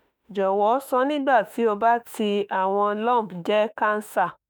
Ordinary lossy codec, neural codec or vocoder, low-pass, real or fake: none; autoencoder, 48 kHz, 32 numbers a frame, DAC-VAE, trained on Japanese speech; none; fake